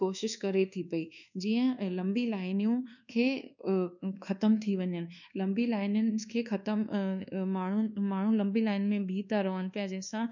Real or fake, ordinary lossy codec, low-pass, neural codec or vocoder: fake; none; 7.2 kHz; codec, 24 kHz, 1.2 kbps, DualCodec